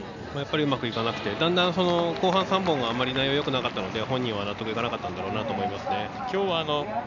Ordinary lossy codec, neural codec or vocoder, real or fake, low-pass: none; none; real; 7.2 kHz